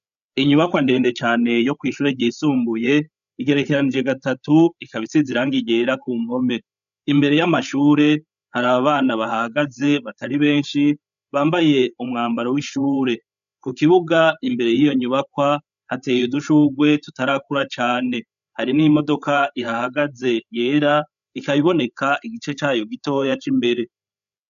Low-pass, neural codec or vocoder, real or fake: 7.2 kHz; codec, 16 kHz, 8 kbps, FreqCodec, larger model; fake